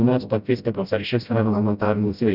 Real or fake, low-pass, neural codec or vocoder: fake; 5.4 kHz; codec, 16 kHz, 0.5 kbps, FreqCodec, smaller model